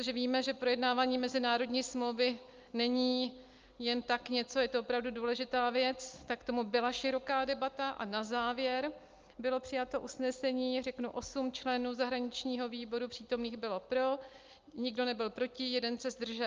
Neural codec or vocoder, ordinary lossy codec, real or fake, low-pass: none; Opus, 24 kbps; real; 7.2 kHz